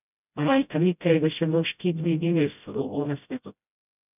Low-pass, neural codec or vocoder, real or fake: 3.6 kHz; codec, 16 kHz, 0.5 kbps, FreqCodec, smaller model; fake